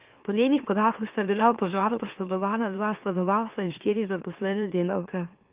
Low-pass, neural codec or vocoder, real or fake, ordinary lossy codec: 3.6 kHz; autoencoder, 44.1 kHz, a latent of 192 numbers a frame, MeloTTS; fake; Opus, 32 kbps